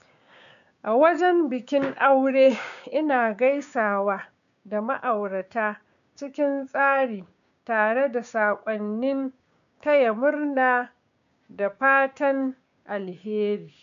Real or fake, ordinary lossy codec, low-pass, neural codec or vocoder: fake; none; 7.2 kHz; codec, 16 kHz, 6 kbps, DAC